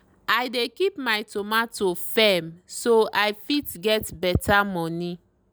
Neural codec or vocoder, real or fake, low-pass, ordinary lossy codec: none; real; none; none